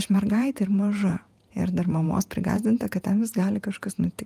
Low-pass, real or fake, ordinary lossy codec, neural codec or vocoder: 14.4 kHz; fake; Opus, 32 kbps; vocoder, 48 kHz, 128 mel bands, Vocos